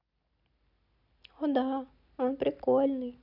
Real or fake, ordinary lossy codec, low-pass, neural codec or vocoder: real; none; 5.4 kHz; none